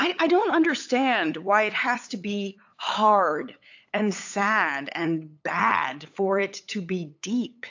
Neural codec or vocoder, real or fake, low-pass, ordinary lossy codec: codec, 16 kHz, 8 kbps, FunCodec, trained on LibriTTS, 25 frames a second; fake; 7.2 kHz; AAC, 48 kbps